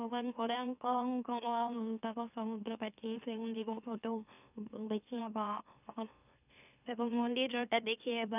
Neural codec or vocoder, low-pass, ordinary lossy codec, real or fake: autoencoder, 44.1 kHz, a latent of 192 numbers a frame, MeloTTS; 3.6 kHz; none; fake